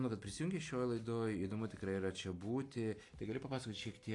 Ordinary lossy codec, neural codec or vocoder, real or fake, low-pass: AAC, 48 kbps; none; real; 10.8 kHz